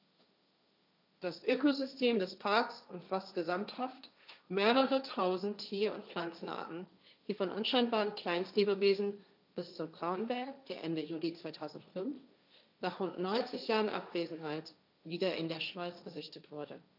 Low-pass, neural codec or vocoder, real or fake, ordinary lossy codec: 5.4 kHz; codec, 16 kHz, 1.1 kbps, Voila-Tokenizer; fake; none